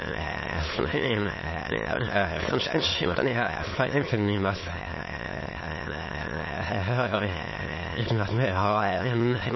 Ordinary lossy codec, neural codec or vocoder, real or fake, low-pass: MP3, 24 kbps; autoencoder, 22.05 kHz, a latent of 192 numbers a frame, VITS, trained on many speakers; fake; 7.2 kHz